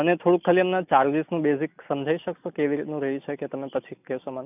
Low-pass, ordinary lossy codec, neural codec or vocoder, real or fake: 3.6 kHz; none; none; real